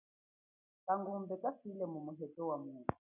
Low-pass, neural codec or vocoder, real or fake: 3.6 kHz; none; real